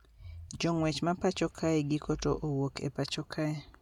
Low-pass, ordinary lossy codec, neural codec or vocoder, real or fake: 19.8 kHz; MP3, 96 kbps; none; real